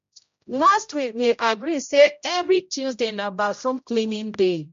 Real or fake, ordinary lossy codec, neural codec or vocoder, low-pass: fake; MP3, 48 kbps; codec, 16 kHz, 0.5 kbps, X-Codec, HuBERT features, trained on general audio; 7.2 kHz